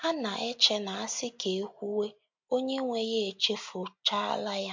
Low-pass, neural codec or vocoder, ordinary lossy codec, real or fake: 7.2 kHz; none; MP3, 48 kbps; real